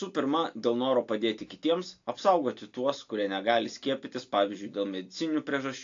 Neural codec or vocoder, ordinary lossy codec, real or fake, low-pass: none; AAC, 48 kbps; real; 7.2 kHz